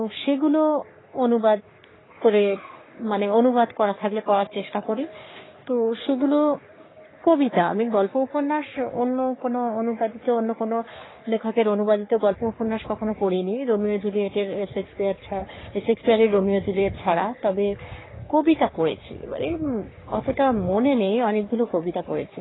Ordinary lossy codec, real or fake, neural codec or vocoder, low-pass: AAC, 16 kbps; fake; codec, 44.1 kHz, 3.4 kbps, Pupu-Codec; 7.2 kHz